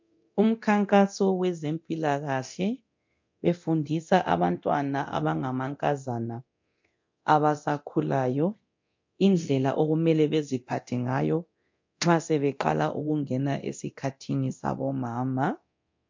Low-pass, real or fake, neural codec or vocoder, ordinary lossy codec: 7.2 kHz; fake; codec, 24 kHz, 0.9 kbps, DualCodec; MP3, 48 kbps